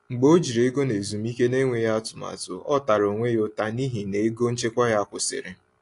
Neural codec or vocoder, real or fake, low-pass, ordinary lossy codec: none; real; 10.8 kHz; AAC, 48 kbps